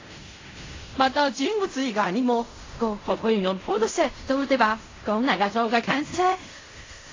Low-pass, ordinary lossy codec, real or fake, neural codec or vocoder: 7.2 kHz; AAC, 32 kbps; fake; codec, 16 kHz in and 24 kHz out, 0.4 kbps, LongCat-Audio-Codec, fine tuned four codebook decoder